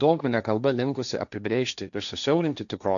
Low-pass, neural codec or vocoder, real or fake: 7.2 kHz; codec, 16 kHz, 1.1 kbps, Voila-Tokenizer; fake